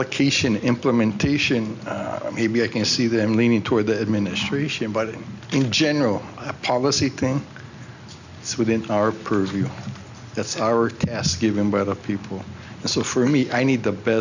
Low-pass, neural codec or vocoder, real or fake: 7.2 kHz; none; real